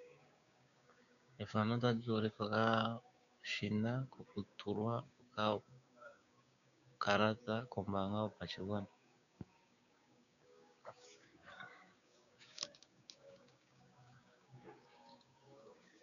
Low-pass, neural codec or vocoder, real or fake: 7.2 kHz; codec, 16 kHz, 6 kbps, DAC; fake